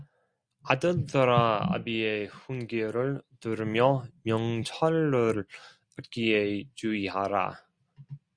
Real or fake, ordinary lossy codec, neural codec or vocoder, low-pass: real; Opus, 64 kbps; none; 9.9 kHz